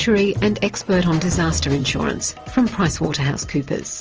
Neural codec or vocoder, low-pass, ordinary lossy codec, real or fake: none; 7.2 kHz; Opus, 24 kbps; real